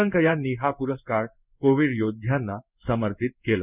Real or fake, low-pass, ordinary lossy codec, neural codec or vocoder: fake; 3.6 kHz; none; codec, 16 kHz in and 24 kHz out, 1 kbps, XY-Tokenizer